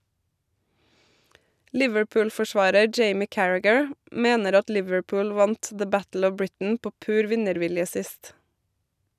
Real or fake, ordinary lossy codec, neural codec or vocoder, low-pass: real; none; none; 14.4 kHz